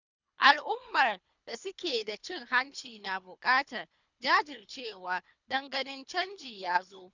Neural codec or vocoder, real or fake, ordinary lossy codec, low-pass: codec, 24 kHz, 3 kbps, HILCodec; fake; none; 7.2 kHz